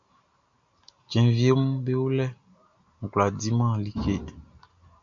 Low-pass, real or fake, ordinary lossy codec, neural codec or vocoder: 7.2 kHz; real; MP3, 64 kbps; none